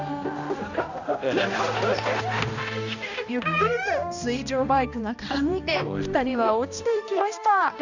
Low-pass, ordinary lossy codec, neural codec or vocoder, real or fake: 7.2 kHz; none; codec, 16 kHz, 1 kbps, X-Codec, HuBERT features, trained on balanced general audio; fake